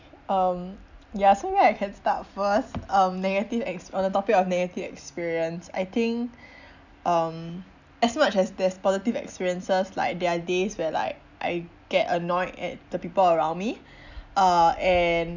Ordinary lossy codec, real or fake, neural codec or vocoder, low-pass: none; real; none; 7.2 kHz